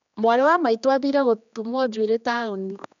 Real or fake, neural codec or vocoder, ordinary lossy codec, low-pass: fake; codec, 16 kHz, 2 kbps, X-Codec, HuBERT features, trained on general audio; MP3, 64 kbps; 7.2 kHz